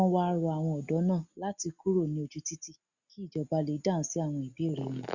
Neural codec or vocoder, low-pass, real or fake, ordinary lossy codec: none; 7.2 kHz; real; Opus, 64 kbps